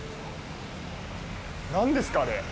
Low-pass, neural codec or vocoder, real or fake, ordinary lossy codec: none; none; real; none